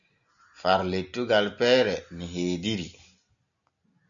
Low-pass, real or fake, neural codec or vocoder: 7.2 kHz; real; none